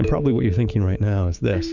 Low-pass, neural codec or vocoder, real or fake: 7.2 kHz; codec, 16 kHz, 6 kbps, DAC; fake